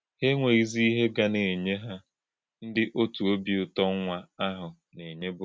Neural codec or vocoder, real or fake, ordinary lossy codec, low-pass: none; real; none; none